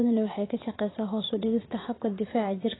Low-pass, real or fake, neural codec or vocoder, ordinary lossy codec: 7.2 kHz; fake; vocoder, 44.1 kHz, 80 mel bands, Vocos; AAC, 16 kbps